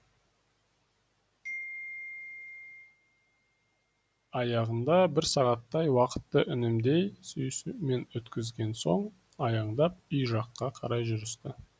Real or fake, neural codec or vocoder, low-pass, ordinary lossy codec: real; none; none; none